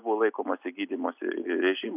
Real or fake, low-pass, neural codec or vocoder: real; 3.6 kHz; none